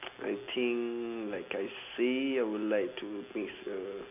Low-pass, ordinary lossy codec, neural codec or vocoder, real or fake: 3.6 kHz; none; none; real